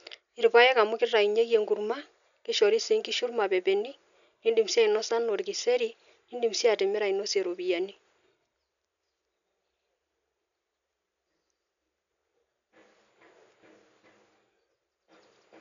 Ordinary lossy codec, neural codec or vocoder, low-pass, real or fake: none; none; 7.2 kHz; real